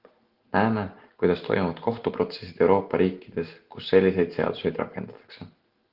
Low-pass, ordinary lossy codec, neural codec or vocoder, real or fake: 5.4 kHz; Opus, 16 kbps; none; real